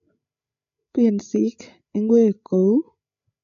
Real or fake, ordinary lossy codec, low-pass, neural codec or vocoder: fake; none; 7.2 kHz; codec, 16 kHz, 8 kbps, FreqCodec, larger model